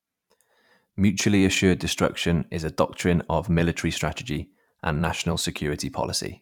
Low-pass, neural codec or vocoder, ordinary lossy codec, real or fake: 19.8 kHz; vocoder, 44.1 kHz, 128 mel bands every 512 samples, BigVGAN v2; none; fake